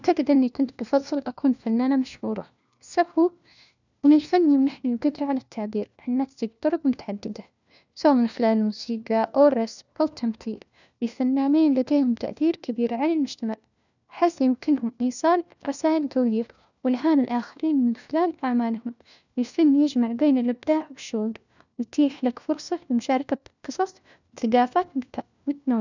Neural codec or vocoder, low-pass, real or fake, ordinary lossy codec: codec, 16 kHz, 1 kbps, FunCodec, trained on LibriTTS, 50 frames a second; 7.2 kHz; fake; none